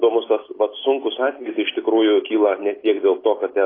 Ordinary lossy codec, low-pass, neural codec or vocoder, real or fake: AAC, 24 kbps; 5.4 kHz; none; real